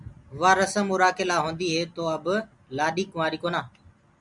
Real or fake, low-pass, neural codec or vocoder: real; 10.8 kHz; none